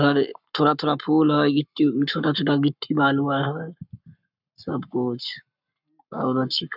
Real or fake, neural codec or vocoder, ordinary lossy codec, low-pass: fake; codec, 44.1 kHz, 7.8 kbps, Pupu-Codec; none; 5.4 kHz